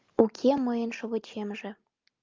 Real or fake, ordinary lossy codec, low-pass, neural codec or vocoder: real; Opus, 24 kbps; 7.2 kHz; none